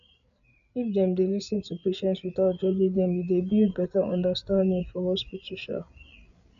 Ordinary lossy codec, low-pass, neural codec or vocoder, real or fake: none; 7.2 kHz; codec, 16 kHz, 8 kbps, FreqCodec, larger model; fake